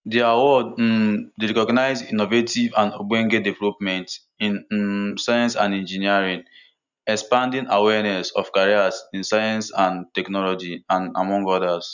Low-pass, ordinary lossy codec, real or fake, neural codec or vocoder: 7.2 kHz; none; real; none